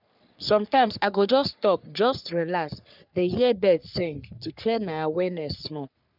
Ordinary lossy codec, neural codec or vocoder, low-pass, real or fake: none; codec, 44.1 kHz, 3.4 kbps, Pupu-Codec; 5.4 kHz; fake